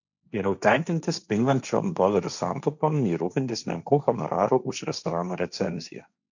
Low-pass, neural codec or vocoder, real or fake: 7.2 kHz; codec, 16 kHz, 1.1 kbps, Voila-Tokenizer; fake